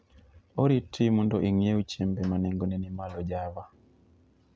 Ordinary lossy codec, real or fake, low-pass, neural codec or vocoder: none; real; none; none